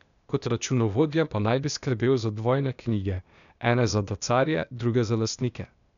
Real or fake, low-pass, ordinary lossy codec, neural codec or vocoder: fake; 7.2 kHz; none; codec, 16 kHz, 0.8 kbps, ZipCodec